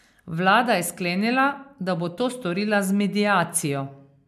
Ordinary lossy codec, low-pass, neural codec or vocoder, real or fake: MP3, 96 kbps; 14.4 kHz; none; real